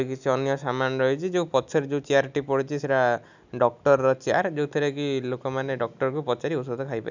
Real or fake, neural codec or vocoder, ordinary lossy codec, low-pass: real; none; none; 7.2 kHz